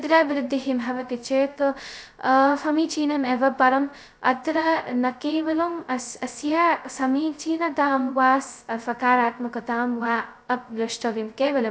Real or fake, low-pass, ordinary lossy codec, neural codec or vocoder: fake; none; none; codec, 16 kHz, 0.2 kbps, FocalCodec